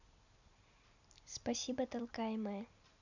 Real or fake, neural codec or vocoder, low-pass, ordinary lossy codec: real; none; 7.2 kHz; none